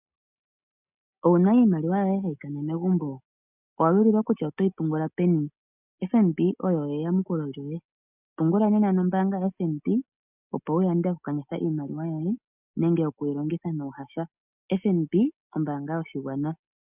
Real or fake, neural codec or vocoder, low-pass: real; none; 3.6 kHz